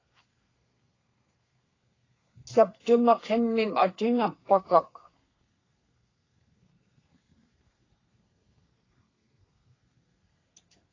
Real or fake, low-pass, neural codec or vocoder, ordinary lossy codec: fake; 7.2 kHz; codec, 32 kHz, 1.9 kbps, SNAC; AAC, 32 kbps